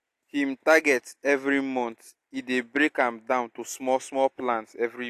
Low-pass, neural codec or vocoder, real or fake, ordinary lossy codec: 14.4 kHz; none; real; AAC, 64 kbps